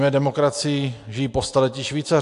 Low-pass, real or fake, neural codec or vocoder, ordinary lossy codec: 10.8 kHz; real; none; AAC, 64 kbps